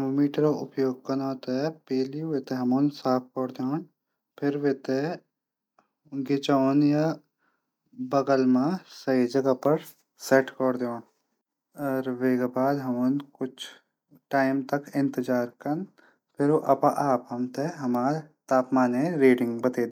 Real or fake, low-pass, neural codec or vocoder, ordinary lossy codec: real; 19.8 kHz; none; MP3, 96 kbps